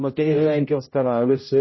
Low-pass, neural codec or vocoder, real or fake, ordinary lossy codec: 7.2 kHz; codec, 16 kHz, 0.5 kbps, X-Codec, HuBERT features, trained on general audio; fake; MP3, 24 kbps